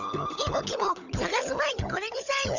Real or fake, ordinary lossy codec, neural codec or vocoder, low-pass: fake; none; codec, 16 kHz, 16 kbps, FunCodec, trained on LibriTTS, 50 frames a second; 7.2 kHz